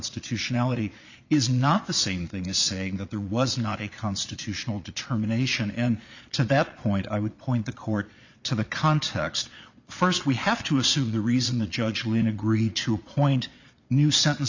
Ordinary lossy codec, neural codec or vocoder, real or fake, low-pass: Opus, 64 kbps; vocoder, 22.05 kHz, 80 mel bands, Vocos; fake; 7.2 kHz